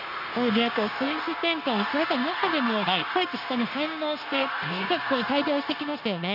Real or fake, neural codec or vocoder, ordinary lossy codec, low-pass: fake; codec, 16 kHz, 0.9 kbps, LongCat-Audio-Codec; none; 5.4 kHz